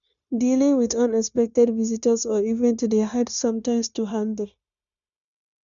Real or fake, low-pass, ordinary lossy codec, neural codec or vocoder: fake; 7.2 kHz; none; codec, 16 kHz, 0.9 kbps, LongCat-Audio-Codec